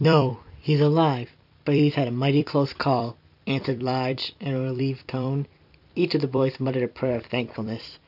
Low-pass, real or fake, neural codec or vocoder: 5.4 kHz; fake; vocoder, 44.1 kHz, 128 mel bands every 256 samples, BigVGAN v2